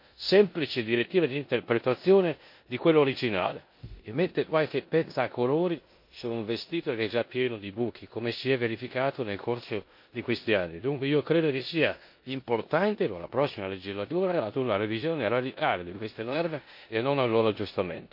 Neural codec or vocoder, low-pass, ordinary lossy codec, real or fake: codec, 16 kHz in and 24 kHz out, 0.9 kbps, LongCat-Audio-Codec, four codebook decoder; 5.4 kHz; MP3, 32 kbps; fake